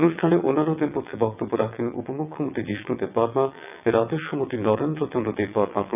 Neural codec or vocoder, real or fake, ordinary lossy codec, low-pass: vocoder, 22.05 kHz, 80 mel bands, WaveNeXt; fake; none; 3.6 kHz